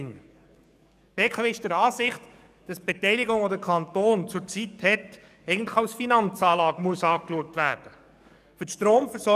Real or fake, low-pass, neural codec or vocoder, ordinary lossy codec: fake; 14.4 kHz; codec, 44.1 kHz, 7.8 kbps, DAC; none